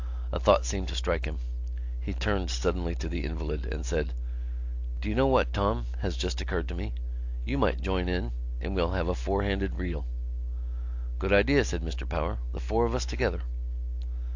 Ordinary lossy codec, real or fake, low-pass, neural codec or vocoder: AAC, 48 kbps; real; 7.2 kHz; none